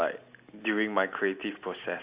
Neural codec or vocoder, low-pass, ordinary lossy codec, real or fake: none; 3.6 kHz; Opus, 64 kbps; real